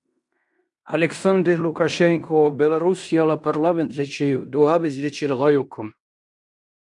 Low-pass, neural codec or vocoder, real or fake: 10.8 kHz; codec, 16 kHz in and 24 kHz out, 0.9 kbps, LongCat-Audio-Codec, fine tuned four codebook decoder; fake